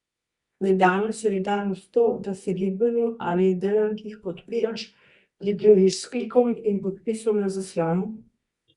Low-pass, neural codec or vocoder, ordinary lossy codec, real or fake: 10.8 kHz; codec, 24 kHz, 0.9 kbps, WavTokenizer, medium music audio release; Opus, 64 kbps; fake